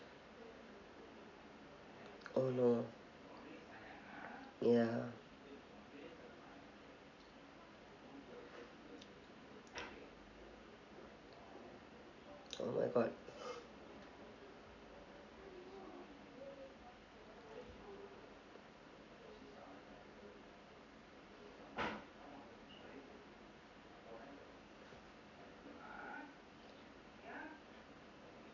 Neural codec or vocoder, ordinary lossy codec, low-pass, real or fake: none; none; 7.2 kHz; real